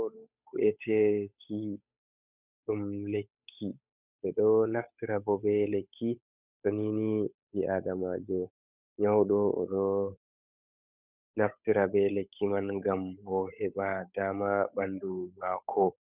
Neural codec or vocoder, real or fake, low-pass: codec, 16 kHz, 8 kbps, FunCodec, trained on Chinese and English, 25 frames a second; fake; 3.6 kHz